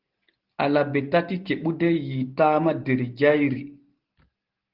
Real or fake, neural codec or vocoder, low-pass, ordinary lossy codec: real; none; 5.4 kHz; Opus, 16 kbps